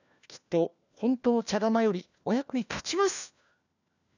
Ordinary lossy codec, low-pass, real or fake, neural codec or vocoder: none; 7.2 kHz; fake; codec, 16 kHz, 1 kbps, FunCodec, trained on LibriTTS, 50 frames a second